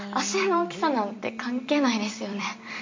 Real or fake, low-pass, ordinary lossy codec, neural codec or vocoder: fake; 7.2 kHz; MP3, 48 kbps; vocoder, 44.1 kHz, 128 mel bands every 256 samples, BigVGAN v2